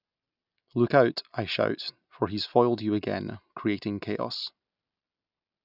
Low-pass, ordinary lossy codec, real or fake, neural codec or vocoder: 5.4 kHz; none; real; none